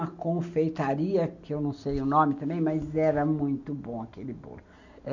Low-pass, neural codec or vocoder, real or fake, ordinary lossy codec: 7.2 kHz; none; real; none